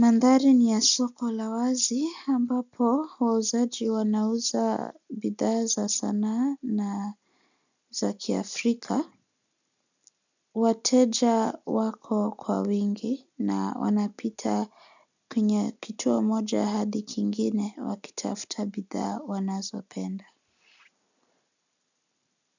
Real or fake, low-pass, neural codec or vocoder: real; 7.2 kHz; none